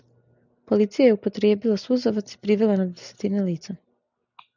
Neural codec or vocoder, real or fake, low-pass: none; real; 7.2 kHz